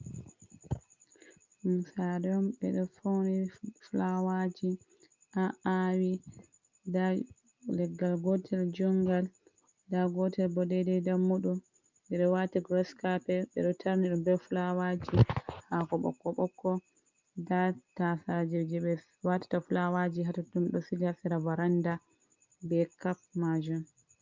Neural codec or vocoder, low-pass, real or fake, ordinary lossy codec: none; 7.2 kHz; real; Opus, 24 kbps